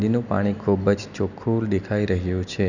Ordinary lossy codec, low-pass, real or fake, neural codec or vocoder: none; 7.2 kHz; real; none